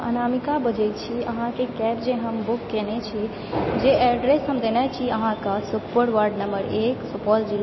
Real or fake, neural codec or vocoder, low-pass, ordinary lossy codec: real; none; 7.2 kHz; MP3, 24 kbps